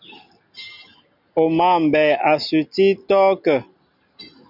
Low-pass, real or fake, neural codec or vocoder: 5.4 kHz; real; none